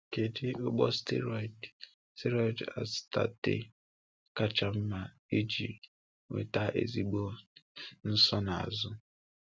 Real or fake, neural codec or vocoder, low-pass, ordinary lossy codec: real; none; none; none